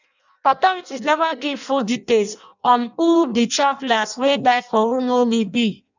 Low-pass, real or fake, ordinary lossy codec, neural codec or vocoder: 7.2 kHz; fake; none; codec, 16 kHz in and 24 kHz out, 0.6 kbps, FireRedTTS-2 codec